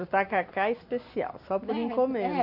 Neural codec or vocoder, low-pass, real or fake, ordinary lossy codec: none; 5.4 kHz; real; none